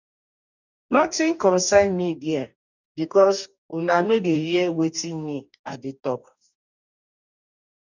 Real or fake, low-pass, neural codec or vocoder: fake; 7.2 kHz; codec, 44.1 kHz, 2.6 kbps, DAC